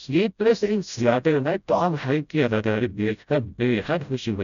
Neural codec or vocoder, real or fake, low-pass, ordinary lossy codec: codec, 16 kHz, 0.5 kbps, FreqCodec, smaller model; fake; 7.2 kHz; none